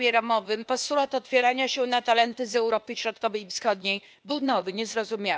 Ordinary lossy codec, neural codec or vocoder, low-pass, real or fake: none; codec, 16 kHz, 0.8 kbps, ZipCodec; none; fake